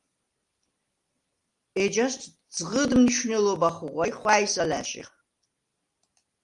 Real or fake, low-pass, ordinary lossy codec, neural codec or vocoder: real; 10.8 kHz; Opus, 32 kbps; none